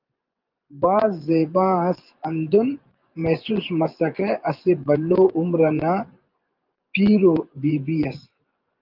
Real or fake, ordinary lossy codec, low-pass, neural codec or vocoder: fake; Opus, 24 kbps; 5.4 kHz; vocoder, 24 kHz, 100 mel bands, Vocos